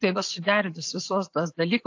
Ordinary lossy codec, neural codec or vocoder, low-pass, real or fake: AAC, 48 kbps; none; 7.2 kHz; real